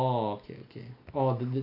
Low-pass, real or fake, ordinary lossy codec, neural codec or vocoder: 5.4 kHz; real; none; none